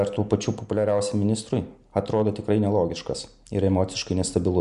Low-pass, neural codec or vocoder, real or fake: 10.8 kHz; none; real